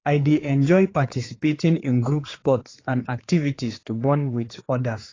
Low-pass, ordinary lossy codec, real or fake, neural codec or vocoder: 7.2 kHz; AAC, 32 kbps; fake; codec, 16 kHz, 4 kbps, X-Codec, HuBERT features, trained on general audio